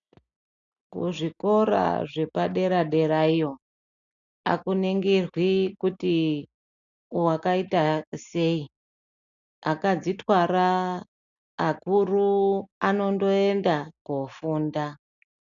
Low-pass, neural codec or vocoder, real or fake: 7.2 kHz; none; real